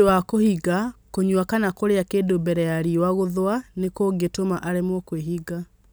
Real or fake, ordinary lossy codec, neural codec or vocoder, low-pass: real; none; none; none